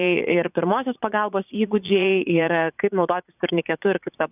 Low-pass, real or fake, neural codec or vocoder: 3.6 kHz; fake; vocoder, 44.1 kHz, 80 mel bands, Vocos